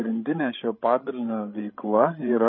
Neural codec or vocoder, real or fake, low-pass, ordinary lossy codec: codec, 16 kHz, 16 kbps, FreqCodec, larger model; fake; 7.2 kHz; MP3, 24 kbps